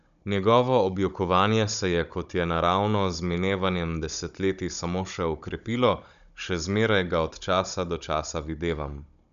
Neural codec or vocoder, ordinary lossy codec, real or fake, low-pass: codec, 16 kHz, 16 kbps, FunCodec, trained on Chinese and English, 50 frames a second; none; fake; 7.2 kHz